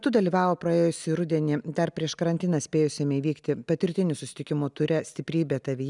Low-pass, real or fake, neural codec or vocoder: 10.8 kHz; real; none